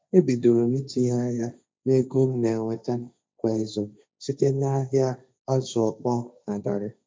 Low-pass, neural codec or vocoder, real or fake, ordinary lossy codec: none; codec, 16 kHz, 1.1 kbps, Voila-Tokenizer; fake; none